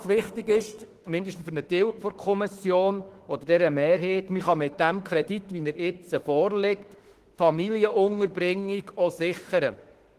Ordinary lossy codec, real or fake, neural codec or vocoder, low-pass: Opus, 16 kbps; fake; autoencoder, 48 kHz, 32 numbers a frame, DAC-VAE, trained on Japanese speech; 14.4 kHz